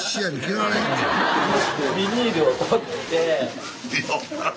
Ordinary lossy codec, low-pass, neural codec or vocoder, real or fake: none; none; none; real